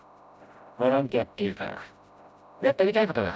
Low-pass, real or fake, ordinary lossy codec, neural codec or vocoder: none; fake; none; codec, 16 kHz, 0.5 kbps, FreqCodec, smaller model